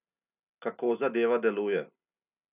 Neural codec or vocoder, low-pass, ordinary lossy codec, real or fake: none; 3.6 kHz; none; real